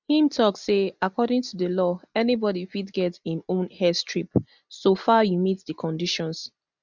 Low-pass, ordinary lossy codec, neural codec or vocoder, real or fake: 7.2 kHz; none; none; real